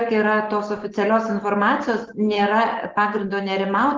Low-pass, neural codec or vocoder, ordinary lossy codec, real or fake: 7.2 kHz; none; Opus, 16 kbps; real